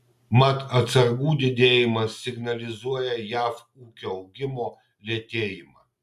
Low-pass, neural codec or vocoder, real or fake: 14.4 kHz; none; real